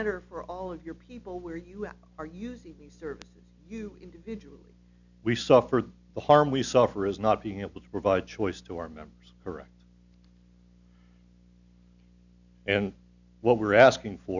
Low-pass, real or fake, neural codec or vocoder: 7.2 kHz; real; none